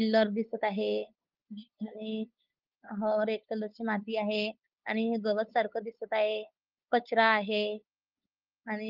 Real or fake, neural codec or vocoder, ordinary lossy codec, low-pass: fake; codec, 16 kHz, 8 kbps, FunCodec, trained on LibriTTS, 25 frames a second; Opus, 32 kbps; 5.4 kHz